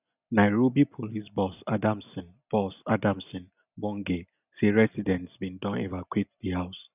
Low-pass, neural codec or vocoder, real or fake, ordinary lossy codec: 3.6 kHz; none; real; none